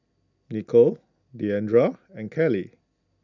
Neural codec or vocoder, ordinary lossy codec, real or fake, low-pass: none; none; real; 7.2 kHz